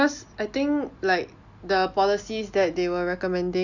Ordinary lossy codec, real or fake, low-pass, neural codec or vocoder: none; real; 7.2 kHz; none